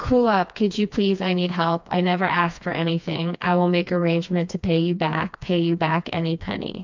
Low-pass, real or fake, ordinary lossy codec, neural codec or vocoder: 7.2 kHz; fake; MP3, 64 kbps; codec, 16 kHz, 2 kbps, FreqCodec, smaller model